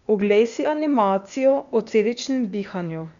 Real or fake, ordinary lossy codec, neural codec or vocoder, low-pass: fake; none; codec, 16 kHz, 0.8 kbps, ZipCodec; 7.2 kHz